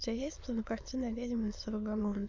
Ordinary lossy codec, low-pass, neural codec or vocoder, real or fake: MP3, 64 kbps; 7.2 kHz; autoencoder, 22.05 kHz, a latent of 192 numbers a frame, VITS, trained on many speakers; fake